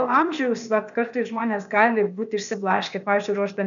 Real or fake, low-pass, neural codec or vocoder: fake; 7.2 kHz; codec, 16 kHz, 0.8 kbps, ZipCodec